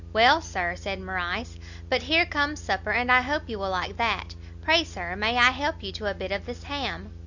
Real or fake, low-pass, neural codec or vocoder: real; 7.2 kHz; none